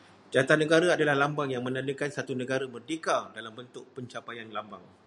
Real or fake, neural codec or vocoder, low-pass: real; none; 10.8 kHz